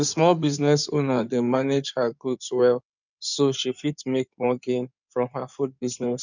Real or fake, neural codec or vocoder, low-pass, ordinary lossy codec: fake; codec, 16 kHz in and 24 kHz out, 2.2 kbps, FireRedTTS-2 codec; 7.2 kHz; none